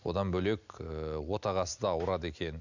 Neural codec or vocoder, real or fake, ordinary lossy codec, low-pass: none; real; none; 7.2 kHz